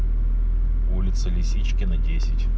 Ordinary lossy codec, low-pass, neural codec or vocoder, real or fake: none; none; none; real